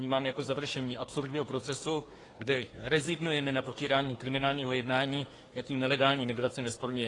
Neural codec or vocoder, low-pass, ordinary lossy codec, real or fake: codec, 24 kHz, 1 kbps, SNAC; 10.8 kHz; AAC, 32 kbps; fake